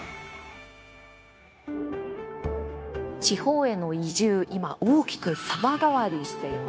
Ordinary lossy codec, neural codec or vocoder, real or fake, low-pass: none; codec, 16 kHz, 0.9 kbps, LongCat-Audio-Codec; fake; none